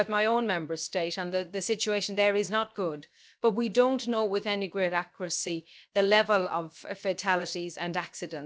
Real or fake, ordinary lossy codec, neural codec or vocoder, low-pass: fake; none; codec, 16 kHz, 0.7 kbps, FocalCodec; none